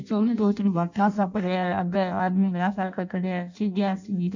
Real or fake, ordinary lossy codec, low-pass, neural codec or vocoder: fake; none; 7.2 kHz; codec, 16 kHz in and 24 kHz out, 0.6 kbps, FireRedTTS-2 codec